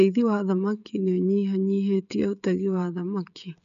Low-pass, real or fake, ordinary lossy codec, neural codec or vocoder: 7.2 kHz; fake; none; codec, 16 kHz, 16 kbps, FreqCodec, smaller model